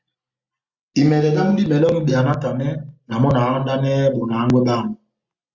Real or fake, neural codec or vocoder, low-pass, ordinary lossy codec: real; none; 7.2 kHz; Opus, 64 kbps